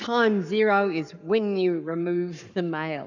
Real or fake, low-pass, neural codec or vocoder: fake; 7.2 kHz; codec, 44.1 kHz, 7.8 kbps, Pupu-Codec